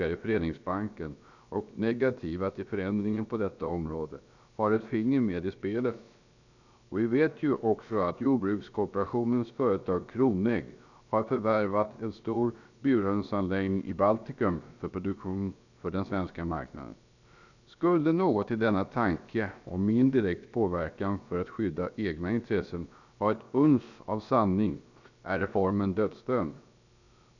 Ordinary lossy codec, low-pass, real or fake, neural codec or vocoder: none; 7.2 kHz; fake; codec, 16 kHz, about 1 kbps, DyCAST, with the encoder's durations